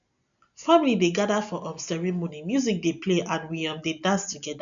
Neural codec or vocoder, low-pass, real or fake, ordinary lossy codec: none; 7.2 kHz; real; none